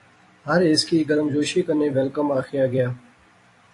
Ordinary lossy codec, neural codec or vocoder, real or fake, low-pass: AAC, 48 kbps; vocoder, 44.1 kHz, 128 mel bands every 256 samples, BigVGAN v2; fake; 10.8 kHz